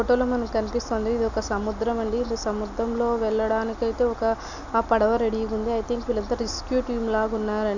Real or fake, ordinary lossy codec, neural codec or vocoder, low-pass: real; none; none; 7.2 kHz